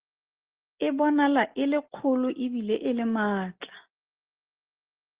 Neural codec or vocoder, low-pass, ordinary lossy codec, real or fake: none; 3.6 kHz; Opus, 16 kbps; real